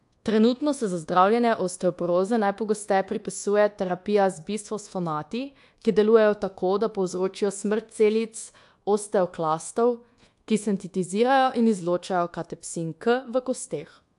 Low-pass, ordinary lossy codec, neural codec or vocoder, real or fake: 10.8 kHz; AAC, 64 kbps; codec, 24 kHz, 1.2 kbps, DualCodec; fake